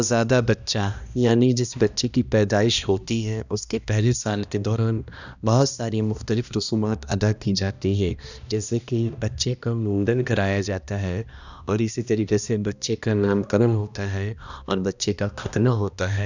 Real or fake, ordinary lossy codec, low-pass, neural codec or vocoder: fake; none; 7.2 kHz; codec, 16 kHz, 1 kbps, X-Codec, HuBERT features, trained on balanced general audio